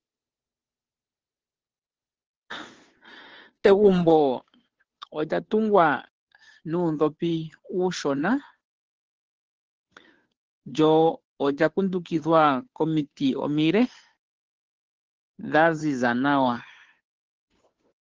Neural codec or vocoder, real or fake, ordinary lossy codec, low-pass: codec, 16 kHz, 2 kbps, FunCodec, trained on Chinese and English, 25 frames a second; fake; Opus, 16 kbps; 7.2 kHz